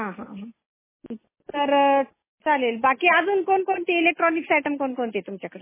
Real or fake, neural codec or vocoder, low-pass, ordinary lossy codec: real; none; 3.6 kHz; MP3, 16 kbps